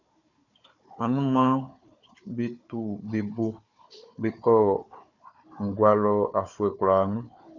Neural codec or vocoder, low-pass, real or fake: codec, 16 kHz, 4 kbps, FunCodec, trained on Chinese and English, 50 frames a second; 7.2 kHz; fake